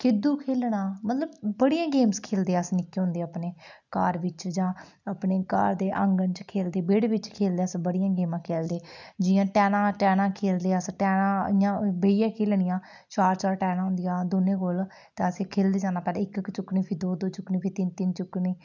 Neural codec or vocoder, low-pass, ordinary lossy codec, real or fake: none; 7.2 kHz; none; real